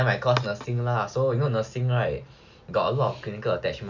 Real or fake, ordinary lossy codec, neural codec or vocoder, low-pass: real; none; none; 7.2 kHz